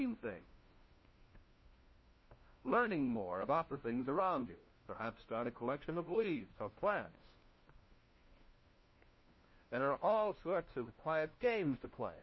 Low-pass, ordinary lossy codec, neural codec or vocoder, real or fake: 7.2 kHz; MP3, 24 kbps; codec, 16 kHz, 1 kbps, FunCodec, trained on LibriTTS, 50 frames a second; fake